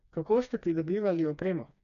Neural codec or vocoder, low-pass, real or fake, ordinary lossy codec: codec, 16 kHz, 2 kbps, FreqCodec, smaller model; 7.2 kHz; fake; none